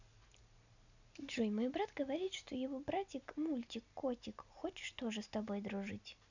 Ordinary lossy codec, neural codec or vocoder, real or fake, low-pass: none; none; real; 7.2 kHz